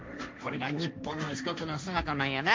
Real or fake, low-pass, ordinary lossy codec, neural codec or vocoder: fake; none; none; codec, 16 kHz, 1.1 kbps, Voila-Tokenizer